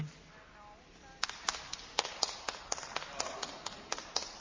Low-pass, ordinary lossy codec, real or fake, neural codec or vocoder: 7.2 kHz; MP3, 32 kbps; real; none